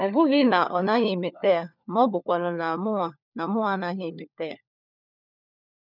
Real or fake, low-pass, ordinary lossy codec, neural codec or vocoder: fake; 5.4 kHz; none; codec, 16 kHz, 4 kbps, FunCodec, trained on LibriTTS, 50 frames a second